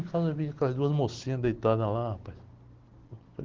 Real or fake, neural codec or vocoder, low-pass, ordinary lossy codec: real; none; 7.2 kHz; Opus, 16 kbps